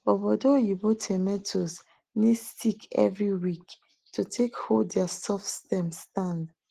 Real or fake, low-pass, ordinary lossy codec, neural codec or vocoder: real; 14.4 kHz; Opus, 16 kbps; none